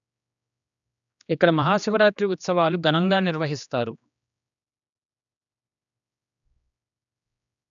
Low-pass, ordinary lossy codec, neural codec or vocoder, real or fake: 7.2 kHz; none; codec, 16 kHz, 2 kbps, X-Codec, HuBERT features, trained on general audio; fake